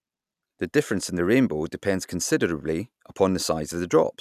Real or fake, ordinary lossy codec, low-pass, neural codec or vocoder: real; none; 14.4 kHz; none